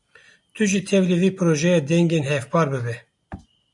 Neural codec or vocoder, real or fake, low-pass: none; real; 10.8 kHz